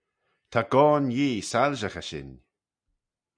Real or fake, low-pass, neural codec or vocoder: real; 9.9 kHz; none